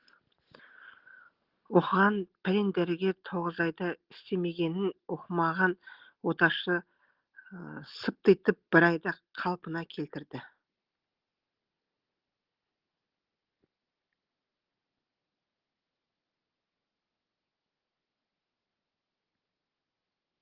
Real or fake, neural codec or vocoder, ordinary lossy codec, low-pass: real; none; Opus, 16 kbps; 5.4 kHz